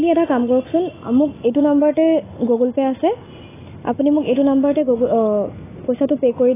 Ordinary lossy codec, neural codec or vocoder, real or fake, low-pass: AAC, 16 kbps; none; real; 3.6 kHz